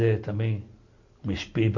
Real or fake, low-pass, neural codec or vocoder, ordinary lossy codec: real; 7.2 kHz; none; Opus, 64 kbps